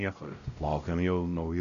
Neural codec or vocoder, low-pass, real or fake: codec, 16 kHz, 0.5 kbps, X-Codec, WavLM features, trained on Multilingual LibriSpeech; 7.2 kHz; fake